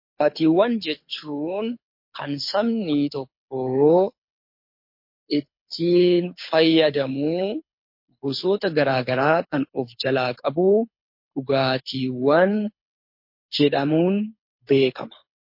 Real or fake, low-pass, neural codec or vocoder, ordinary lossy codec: fake; 5.4 kHz; codec, 24 kHz, 6 kbps, HILCodec; MP3, 32 kbps